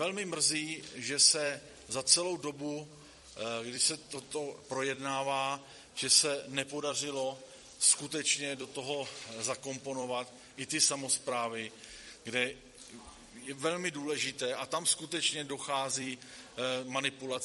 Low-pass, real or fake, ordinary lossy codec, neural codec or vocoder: 19.8 kHz; fake; MP3, 48 kbps; vocoder, 44.1 kHz, 128 mel bands every 256 samples, BigVGAN v2